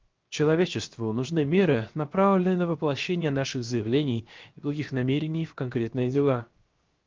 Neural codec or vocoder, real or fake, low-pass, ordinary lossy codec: codec, 16 kHz, 0.7 kbps, FocalCodec; fake; 7.2 kHz; Opus, 24 kbps